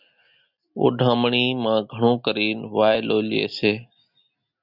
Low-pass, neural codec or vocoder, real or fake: 5.4 kHz; none; real